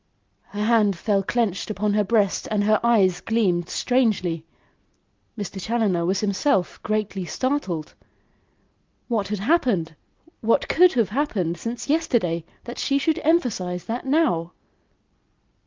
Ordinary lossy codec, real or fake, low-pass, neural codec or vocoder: Opus, 24 kbps; real; 7.2 kHz; none